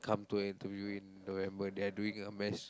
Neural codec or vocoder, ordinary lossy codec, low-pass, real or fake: none; none; none; real